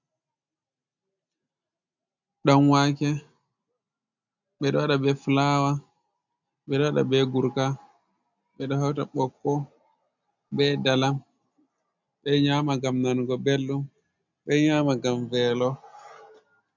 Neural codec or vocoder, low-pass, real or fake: none; 7.2 kHz; real